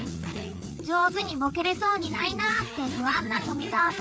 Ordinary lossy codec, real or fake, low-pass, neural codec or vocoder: none; fake; none; codec, 16 kHz, 4 kbps, FreqCodec, larger model